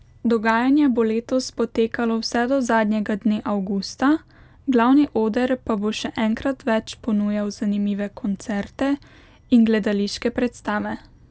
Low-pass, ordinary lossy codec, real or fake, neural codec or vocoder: none; none; real; none